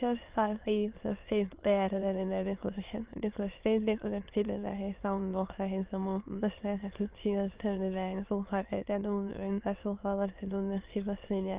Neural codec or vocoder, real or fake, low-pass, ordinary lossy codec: autoencoder, 22.05 kHz, a latent of 192 numbers a frame, VITS, trained on many speakers; fake; 3.6 kHz; Opus, 32 kbps